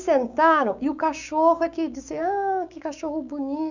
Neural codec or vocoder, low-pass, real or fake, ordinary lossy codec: codec, 16 kHz, 6 kbps, DAC; 7.2 kHz; fake; none